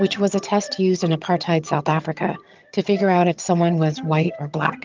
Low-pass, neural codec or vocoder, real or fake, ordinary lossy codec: 7.2 kHz; vocoder, 22.05 kHz, 80 mel bands, HiFi-GAN; fake; Opus, 24 kbps